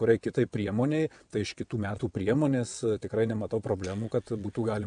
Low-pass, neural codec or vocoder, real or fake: 9.9 kHz; vocoder, 22.05 kHz, 80 mel bands, WaveNeXt; fake